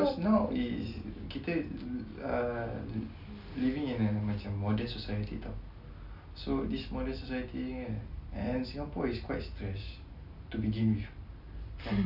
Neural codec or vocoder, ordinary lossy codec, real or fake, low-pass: none; none; real; 5.4 kHz